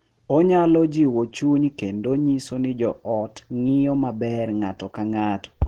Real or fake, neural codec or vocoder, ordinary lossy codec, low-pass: real; none; Opus, 16 kbps; 19.8 kHz